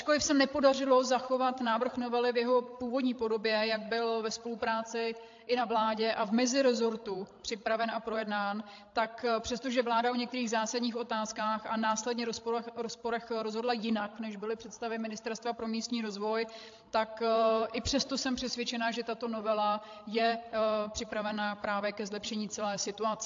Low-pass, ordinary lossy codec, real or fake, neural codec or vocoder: 7.2 kHz; AAC, 48 kbps; fake; codec, 16 kHz, 16 kbps, FreqCodec, larger model